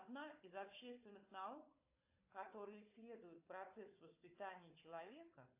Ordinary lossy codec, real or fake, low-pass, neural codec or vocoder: AAC, 32 kbps; fake; 3.6 kHz; codec, 16 kHz, 4 kbps, FunCodec, trained on Chinese and English, 50 frames a second